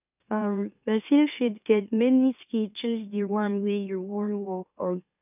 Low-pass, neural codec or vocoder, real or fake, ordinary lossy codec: 3.6 kHz; autoencoder, 44.1 kHz, a latent of 192 numbers a frame, MeloTTS; fake; none